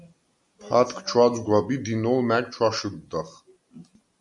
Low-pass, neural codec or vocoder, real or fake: 10.8 kHz; none; real